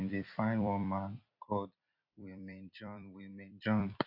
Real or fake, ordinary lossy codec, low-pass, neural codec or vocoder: fake; AAC, 48 kbps; 5.4 kHz; vocoder, 44.1 kHz, 128 mel bands every 256 samples, BigVGAN v2